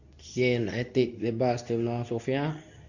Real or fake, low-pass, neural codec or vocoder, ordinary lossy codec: fake; 7.2 kHz; codec, 24 kHz, 0.9 kbps, WavTokenizer, medium speech release version 2; none